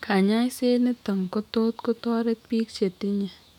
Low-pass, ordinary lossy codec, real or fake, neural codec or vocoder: 19.8 kHz; none; fake; autoencoder, 48 kHz, 128 numbers a frame, DAC-VAE, trained on Japanese speech